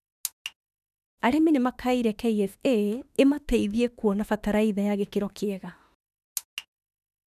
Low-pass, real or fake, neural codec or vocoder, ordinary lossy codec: 14.4 kHz; fake; autoencoder, 48 kHz, 32 numbers a frame, DAC-VAE, trained on Japanese speech; none